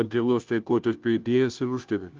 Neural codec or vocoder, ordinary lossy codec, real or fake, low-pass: codec, 16 kHz, 0.5 kbps, FunCodec, trained on Chinese and English, 25 frames a second; Opus, 24 kbps; fake; 7.2 kHz